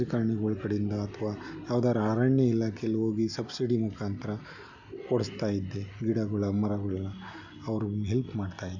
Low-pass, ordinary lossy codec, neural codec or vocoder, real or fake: 7.2 kHz; none; none; real